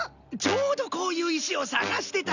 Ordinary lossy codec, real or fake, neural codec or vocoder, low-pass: none; real; none; 7.2 kHz